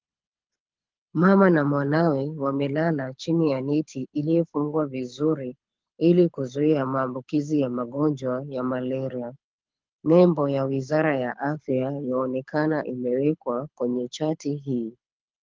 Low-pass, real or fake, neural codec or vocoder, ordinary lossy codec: 7.2 kHz; fake; codec, 24 kHz, 6 kbps, HILCodec; Opus, 32 kbps